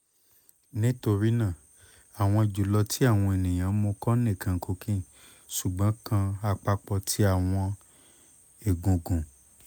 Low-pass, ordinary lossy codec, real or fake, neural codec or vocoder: none; none; real; none